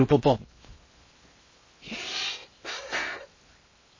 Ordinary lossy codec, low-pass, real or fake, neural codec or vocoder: MP3, 32 kbps; 7.2 kHz; fake; codec, 16 kHz in and 24 kHz out, 0.6 kbps, FocalCodec, streaming, 2048 codes